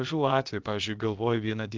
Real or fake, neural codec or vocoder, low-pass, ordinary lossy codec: fake; codec, 16 kHz, about 1 kbps, DyCAST, with the encoder's durations; 7.2 kHz; Opus, 32 kbps